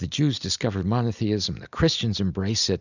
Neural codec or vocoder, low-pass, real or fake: none; 7.2 kHz; real